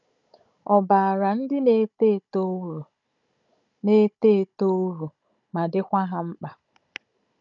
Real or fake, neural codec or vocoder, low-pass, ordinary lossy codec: fake; codec, 16 kHz, 16 kbps, FunCodec, trained on Chinese and English, 50 frames a second; 7.2 kHz; none